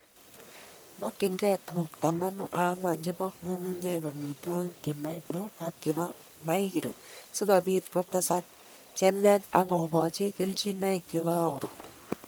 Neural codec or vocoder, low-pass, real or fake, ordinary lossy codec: codec, 44.1 kHz, 1.7 kbps, Pupu-Codec; none; fake; none